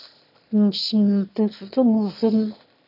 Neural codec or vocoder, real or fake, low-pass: autoencoder, 22.05 kHz, a latent of 192 numbers a frame, VITS, trained on one speaker; fake; 5.4 kHz